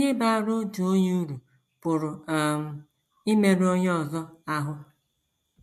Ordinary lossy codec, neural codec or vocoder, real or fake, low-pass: MP3, 64 kbps; none; real; 14.4 kHz